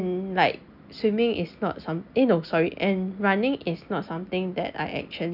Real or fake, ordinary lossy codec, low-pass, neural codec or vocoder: real; none; 5.4 kHz; none